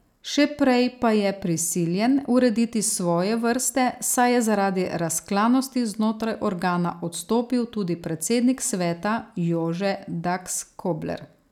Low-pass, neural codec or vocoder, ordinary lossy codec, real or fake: 19.8 kHz; none; none; real